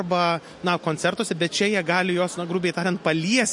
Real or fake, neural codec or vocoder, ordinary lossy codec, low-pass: real; none; MP3, 48 kbps; 10.8 kHz